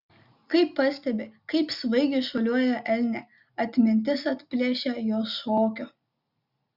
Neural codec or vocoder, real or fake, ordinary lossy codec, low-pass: none; real; Opus, 64 kbps; 5.4 kHz